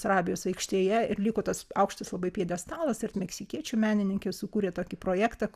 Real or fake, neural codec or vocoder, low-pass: real; none; 14.4 kHz